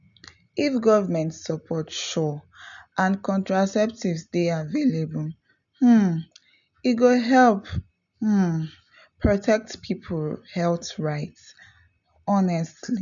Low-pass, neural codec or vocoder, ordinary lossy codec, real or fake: 7.2 kHz; none; none; real